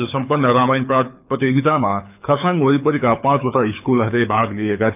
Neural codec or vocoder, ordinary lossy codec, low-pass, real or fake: codec, 24 kHz, 6 kbps, HILCodec; none; 3.6 kHz; fake